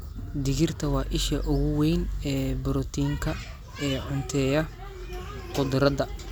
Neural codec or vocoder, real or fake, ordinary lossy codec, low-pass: none; real; none; none